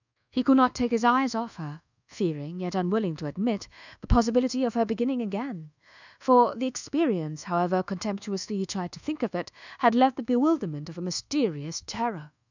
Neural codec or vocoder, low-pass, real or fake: codec, 24 kHz, 1.2 kbps, DualCodec; 7.2 kHz; fake